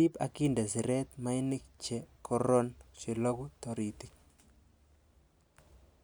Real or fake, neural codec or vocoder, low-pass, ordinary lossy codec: real; none; none; none